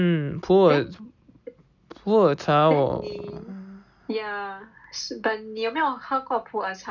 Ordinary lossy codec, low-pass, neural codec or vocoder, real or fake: none; 7.2 kHz; none; real